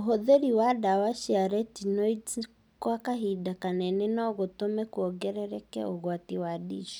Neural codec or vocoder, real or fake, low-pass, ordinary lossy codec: none; real; 19.8 kHz; none